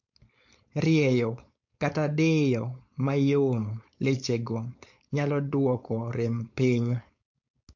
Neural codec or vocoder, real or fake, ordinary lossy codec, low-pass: codec, 16 kHz, 4.8 kbps, FACodec; fake; MP3, 48 kbps; 7.2 kHz